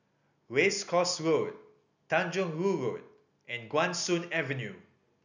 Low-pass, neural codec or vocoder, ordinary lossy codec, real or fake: 7.2 kHz; none; none; real